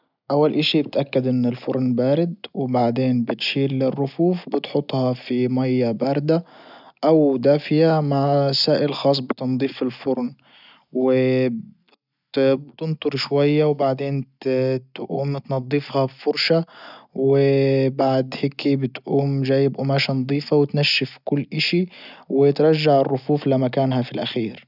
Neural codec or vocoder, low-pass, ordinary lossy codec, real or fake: none; 5.4 kHz; none; real